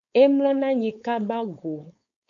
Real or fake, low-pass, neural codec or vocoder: fake; 7.2 kHz; codec, 16 kHz, 4.8 kbps, FACodec